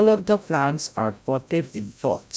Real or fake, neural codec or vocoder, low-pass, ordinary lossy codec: fake; codec, 16 kHz, 0.5 kbps, FreqCodec, larger model; none; none